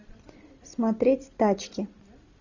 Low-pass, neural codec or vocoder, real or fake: 7.2 kHz; none; real